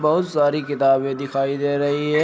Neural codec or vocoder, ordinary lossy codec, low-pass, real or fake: none; none; none; real